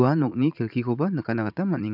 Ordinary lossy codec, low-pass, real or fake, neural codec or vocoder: none; 5.4 kHz; fake; vocoder, 44.1 kHz, 80 mel bands, Vocos